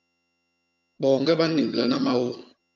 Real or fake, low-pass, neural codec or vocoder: fake; 7.2 kHz; vocoder, 22.05 kHz, 80 mel bands, HiFi-GAN